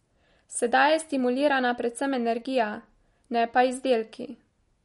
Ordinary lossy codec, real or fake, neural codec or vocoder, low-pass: MP3, 48 kbps; real; none; 19.8 kHz